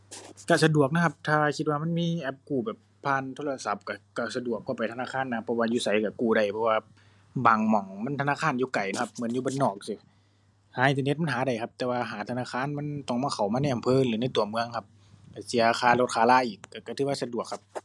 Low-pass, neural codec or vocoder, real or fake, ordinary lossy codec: none; none; real; none